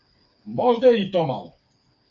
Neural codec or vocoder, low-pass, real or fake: codec, 16 kHz, 4 kbps, FreqCodec, smaller model; 7.2 kHz; fake